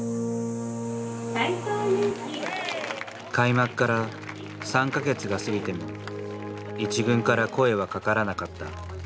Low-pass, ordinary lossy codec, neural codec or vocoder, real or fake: none; none; none; real